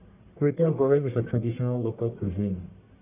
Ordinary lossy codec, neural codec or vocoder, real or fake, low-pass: AAC, 32 kbps; codec, 44.1 kHz, 1.7 kbps, Pupu-Codec; fake; 3.6 kHz